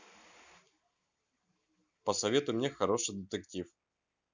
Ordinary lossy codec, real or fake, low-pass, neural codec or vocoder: none; real; none; none